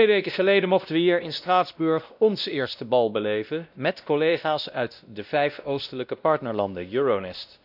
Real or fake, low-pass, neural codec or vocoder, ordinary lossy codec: fake; 5.4 kHz; codec, 16 kHz, 1 kbps, X-Codec, WavLM features, trained on Multilingual LibriSpeech; none